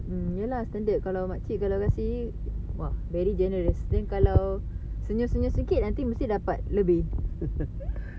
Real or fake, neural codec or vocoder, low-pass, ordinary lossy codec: real; none; none; none